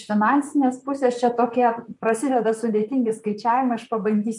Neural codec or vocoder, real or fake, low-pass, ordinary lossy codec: vocoder, 44.1 kHz, 128 mel bands, Pupu-Vocoder; fake; 10.8 kHz; MP3, 64 kbps